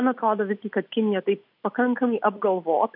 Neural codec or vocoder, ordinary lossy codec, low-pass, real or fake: none; MP3, 48 kbps; 5.4 kHz; real